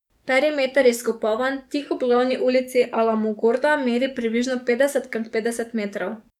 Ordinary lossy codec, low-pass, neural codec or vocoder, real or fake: none; 19.8 kHz; codec, 44.1 kHz, 7.8 kbps, DAC; fake